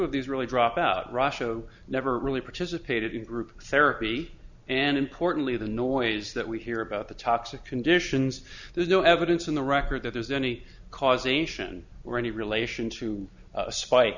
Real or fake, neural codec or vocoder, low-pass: real; none; 7.2 kHz